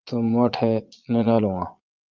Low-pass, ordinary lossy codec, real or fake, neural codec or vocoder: 7.2 kHz; Opus, 24 kbps; fake; vocoder, 22.05 kHz, 80 mel bands, Vocos